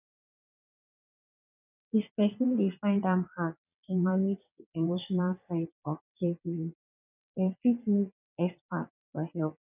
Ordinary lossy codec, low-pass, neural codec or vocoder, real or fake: none; 3.6 kHz; vocoder, 22.05 kHz, 80 mel bands, WaveNeXt; fake